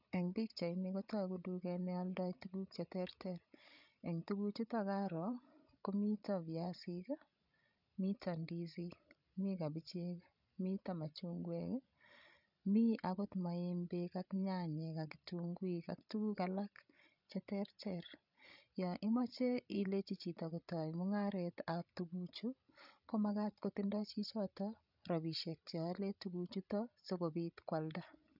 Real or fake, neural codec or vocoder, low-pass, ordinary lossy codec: fake; codec, 16 kHz, 16 kbps, FreqCodec, larger model; 5.4 kHz; none